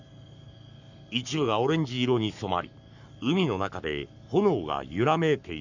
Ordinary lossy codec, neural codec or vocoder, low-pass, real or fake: none; codec, 44.1 kHz, 7.8 kbps, Pupu-Codec; 7.2 kHz; fake